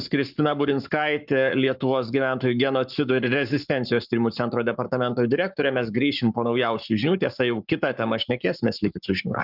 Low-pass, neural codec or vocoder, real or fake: 5.4 kHz; codec, 44.1 kHz, 7.8 kbps, Pupu-Codec; fake